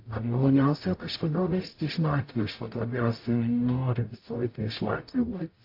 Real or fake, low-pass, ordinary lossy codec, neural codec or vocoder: fake; 5.4 kHz; AAC, 32 kbps; codec, 44.1 kHz, 0.9 kbps, DAC